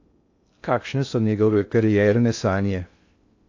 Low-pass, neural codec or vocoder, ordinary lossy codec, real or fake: 7.2 kHz; codec, 16 kHz in and 24 kHz out, 0.6 kbps, FocalCodec, streaming, 2048 codes; AAC, 48 kbps; fake